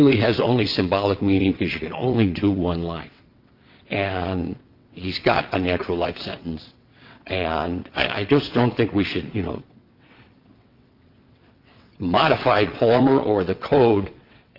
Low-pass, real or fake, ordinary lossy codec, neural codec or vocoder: 5.4 kHz; fake; Opus, 24 kbps; vocoder, 22.05 kHz, 80 mel bands, WaveNeXt